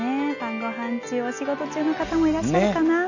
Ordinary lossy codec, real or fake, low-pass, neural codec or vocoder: none; real; 7.2 kHz; none